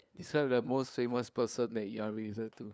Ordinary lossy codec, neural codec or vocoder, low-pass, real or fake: none; codec, 16 kHz, 2 kbps, FunCodec, trained on LibriTTS, 25 frames a second; none; fake